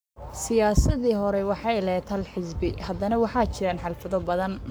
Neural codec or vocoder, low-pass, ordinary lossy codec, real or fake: codec, 44.1 kHz, 7.8 kbps, Pupu-Codec; none; none; fake